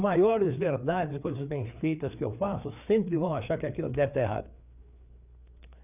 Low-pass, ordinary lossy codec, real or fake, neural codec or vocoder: 3.6 kHz; none; fake; codec, 16 kHz, 4 kbps, FreqCodec, larger model